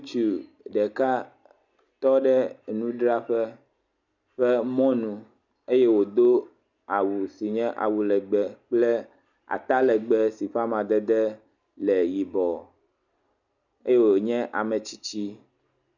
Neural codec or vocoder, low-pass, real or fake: none; 7.2 kHz; real